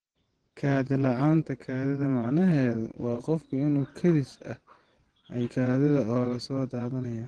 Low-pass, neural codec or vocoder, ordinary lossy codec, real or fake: 9.9 kHz; vocoder, 22.05 kHz, 80 mel bands, WaveNeXt; Opus, 16 kbps; fake